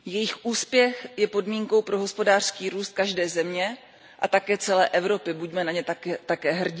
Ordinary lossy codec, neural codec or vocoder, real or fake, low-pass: none; none; real; none